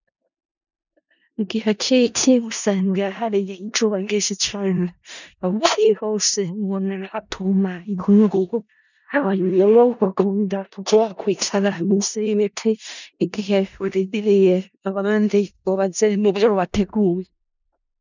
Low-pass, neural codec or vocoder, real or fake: 7.2 kHz; codec, 16 kHz in and 24 kHz out, 0.4 kbps, LongCat-Audio-Codec, four codebook decoder; fake